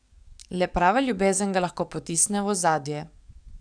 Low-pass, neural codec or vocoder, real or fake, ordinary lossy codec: 9.9 kHz; codec, 44.1 kHz, 7.8 kbps, DAC; fake; none